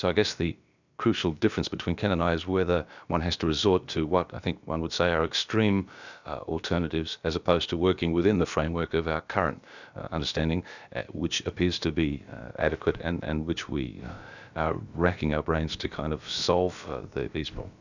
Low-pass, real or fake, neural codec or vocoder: 7.2 kHz; fake; codec, 16 kHz, about 1 kbps, DyCAST, with the encoder's durations